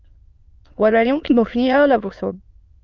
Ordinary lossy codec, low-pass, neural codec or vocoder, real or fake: Opus, 24 kbps; 7.2 kHz; autoencoder, 22.05 kHz, a latent of 192 numbers a frame, VITS, trained on many speakers; fake